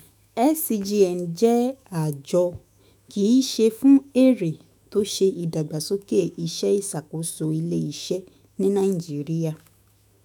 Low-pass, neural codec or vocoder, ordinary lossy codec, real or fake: none; autoencoder, 48 kHz, 128 numbers a frame, DAC-VAE, trained on Japanese speech; none; fake